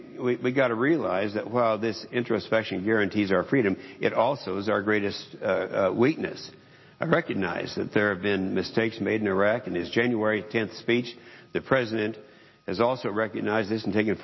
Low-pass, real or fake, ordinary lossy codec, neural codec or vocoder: 7.2 kHz; real; MP3, 24 kbps; none